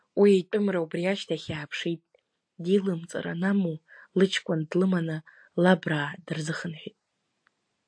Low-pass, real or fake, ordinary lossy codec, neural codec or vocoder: 9.9 kHz; real; AAC, 64 kbps; none